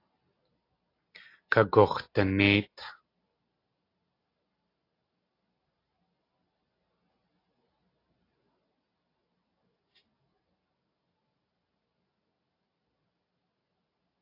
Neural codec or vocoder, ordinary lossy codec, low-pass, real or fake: none; AAC, 32 kbps; 5.4 kHz; real